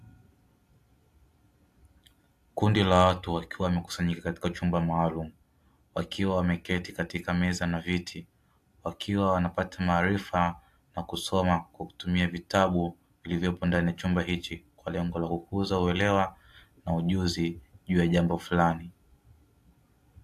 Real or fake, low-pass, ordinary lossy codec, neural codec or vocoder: real; 14.4 kHz; AAC, 64 kbps; none